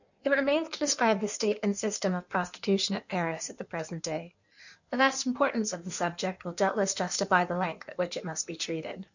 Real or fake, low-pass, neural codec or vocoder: fake; 7.2 kHz; codec, 16 kHz in and 24 kHz out, 1.1 kbps, FireRedTTS-2 codec